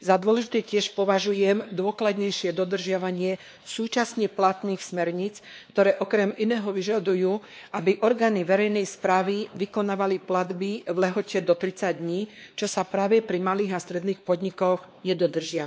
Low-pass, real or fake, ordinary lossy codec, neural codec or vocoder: none; fake; none; codec, 16 kHz, 2 kbps, X-Codec, WavLM features, trained on Multilingual LibriSpeech